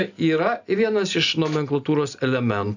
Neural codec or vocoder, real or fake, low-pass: none; real; 7.2 kHz